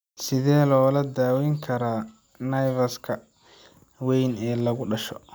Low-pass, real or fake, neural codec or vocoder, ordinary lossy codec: none; real; none; none